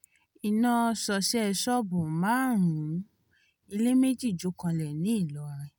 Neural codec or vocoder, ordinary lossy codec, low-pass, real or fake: none; none; none; real